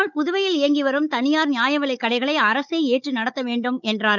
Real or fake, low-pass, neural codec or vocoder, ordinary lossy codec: fake; 7.2 kHz; codec, 16 kHz, 16 kbps, FunCodec, trained on Chinese and English, 50 frames a second; none